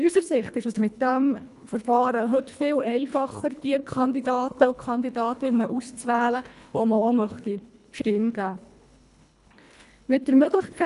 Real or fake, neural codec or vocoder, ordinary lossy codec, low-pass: fake; codec, 24 kHz, 1.5 kbps, HILCodec; none; 10.8 kHz